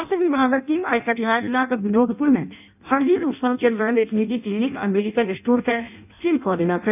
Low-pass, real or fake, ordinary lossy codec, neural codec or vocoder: 3.6 kHz; fake; none; codec, 16 kHz in and 24 kHz out, 0.6 kbps, FireRedTTS-2 codec